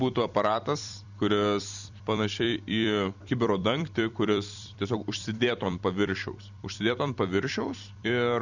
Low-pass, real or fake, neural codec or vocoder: 7.2 kHz; fake; vocoder, 44.1 kHz, 128 mel bands every 256 samples, BigVGAN v2